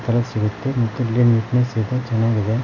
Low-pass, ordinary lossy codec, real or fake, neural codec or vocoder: 7.2 kHz; none; real; none